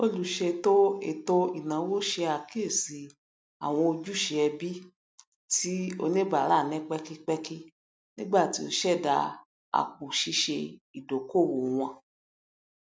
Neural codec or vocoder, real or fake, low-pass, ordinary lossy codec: none; real; none; none